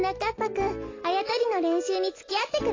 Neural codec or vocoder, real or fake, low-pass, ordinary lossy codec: none; real; 7.2 kHz; AAC, 32 kbps